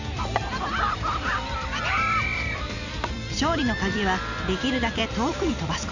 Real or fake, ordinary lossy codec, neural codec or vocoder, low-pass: fake; none; vocoder, 44.1 kHz, 128 mel bands every 256 samples, BigVGAN v2; 7.2 kHz